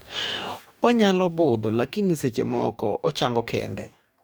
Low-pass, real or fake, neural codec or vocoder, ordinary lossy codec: none; fake; codec, 44.1 kHz, 2.6 kbps, DAC; none